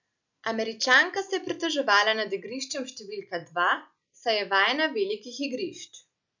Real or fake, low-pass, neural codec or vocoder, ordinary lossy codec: real; 7.2 kHz; none; none